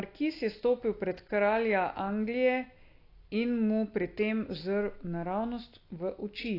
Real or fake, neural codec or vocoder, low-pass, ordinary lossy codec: real; none; 5.4 kHz; AAC, 32 kbps